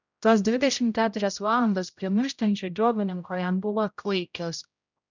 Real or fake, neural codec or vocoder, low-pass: fake; codec, 16 kHz, 0.5 kbps, X-Codec, HuBERT features, trained on general audio; 7.2 kHz